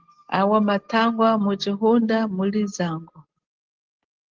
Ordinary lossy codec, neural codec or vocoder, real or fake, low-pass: Opus, 16 kbps; none; real; 7.2 kHz